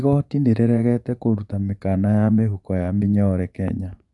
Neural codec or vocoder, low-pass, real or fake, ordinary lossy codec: vocoder, 24 kHz, 100 mel bands, Vocos; 10.8 kHz; fake; none